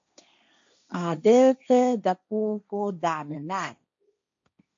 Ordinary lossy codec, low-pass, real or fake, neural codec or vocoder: MP3, 48 kbps; 7.2 kHz; fake; codec, 16 kHz, 1.1 kbps, Voila-Tokenizer